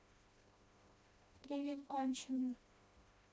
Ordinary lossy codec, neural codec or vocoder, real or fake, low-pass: none; codec, 16 kHz, 1 kbps, FreqCodec, smaller model; fake; none